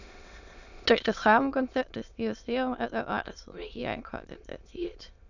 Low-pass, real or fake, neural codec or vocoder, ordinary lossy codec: 7.2 kHz; fake; autoencoder, 22.05 kHz, a latent of 192 numbers a frame, VITS, trained on many speakers; none